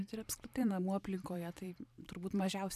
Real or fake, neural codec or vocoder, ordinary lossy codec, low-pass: fake; vocoder, 44.1 kHz, 128 mel bands every 512 samples, BigVGAN v2; AAC, 96 kbps; 14.4 kHz